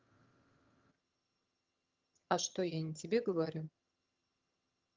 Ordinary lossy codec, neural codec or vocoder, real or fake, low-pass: Opus, 24 kbps; vocoder, 22.05 kHz, 80 mel bands, HiFi-GAN; fake; 7.2 kHz